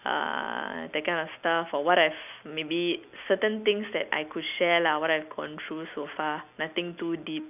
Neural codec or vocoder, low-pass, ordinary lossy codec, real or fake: none; 3.6 kHz; none; real